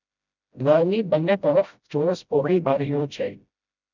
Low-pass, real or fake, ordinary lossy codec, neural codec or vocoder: 7.2 kHz; fake; none; codec, 16 kHz, 0.5 kbps, FreqCodec, smaller model